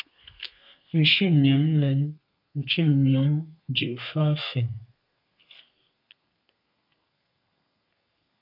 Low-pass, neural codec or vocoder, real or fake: 5.4 kHz; codec, 32 kHz, 1.9 kbps, SNAC; fake